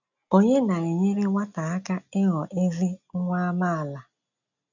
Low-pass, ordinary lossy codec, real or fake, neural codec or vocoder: 7.2 kHz; none; real; none